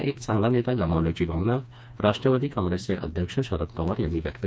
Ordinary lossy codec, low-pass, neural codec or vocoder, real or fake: none; none; codec, 16 kHz, 2 kbps, FreqCodec, smaller model; fake